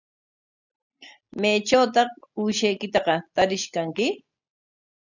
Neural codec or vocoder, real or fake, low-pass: none; real; 7.2 kHz